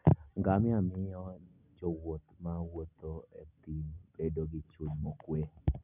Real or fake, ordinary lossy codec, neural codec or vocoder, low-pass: real; none; none; 3.6 kHz